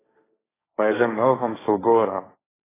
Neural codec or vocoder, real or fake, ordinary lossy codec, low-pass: codec, 16 kHz, 1.1 kbps, Voila-Tokenizer; fake; AAC, 16 kbps; 3.6 kHz